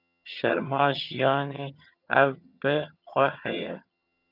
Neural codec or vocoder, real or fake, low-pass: vocoder, 22.05 kHz, 80 mel bands, HiFi-GAN; fake; 5.4 kHz